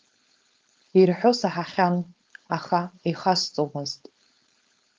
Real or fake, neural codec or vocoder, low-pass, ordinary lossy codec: fake; codec, 16 kHz, 4.8 kbps, FACodec; 7.2 kHz; Opus, 32 kbps